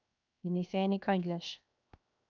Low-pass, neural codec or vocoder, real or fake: 7.2 kHz; codec, 16 kHz, 0.7 kbps, FocalCodec; fake